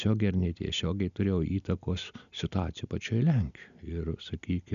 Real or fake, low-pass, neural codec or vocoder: real; 7.2 kHz; none